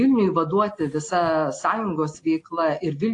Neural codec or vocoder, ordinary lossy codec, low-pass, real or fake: none; AAC, 48 kbps; 10.8 kHz; real